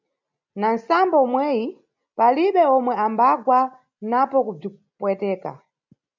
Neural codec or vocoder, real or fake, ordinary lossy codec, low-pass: none; real; MP3, 64 kbps; 7.2 kHz